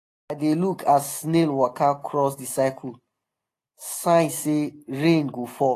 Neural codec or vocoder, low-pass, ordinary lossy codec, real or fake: none; 14.4 kHz; AAC, 48 kbps; real